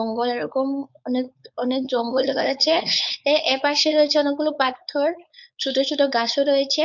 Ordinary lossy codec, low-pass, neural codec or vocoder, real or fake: none; 7.2 kHz; codec, 16 kHz, 4.8 kbps, FACodec; fake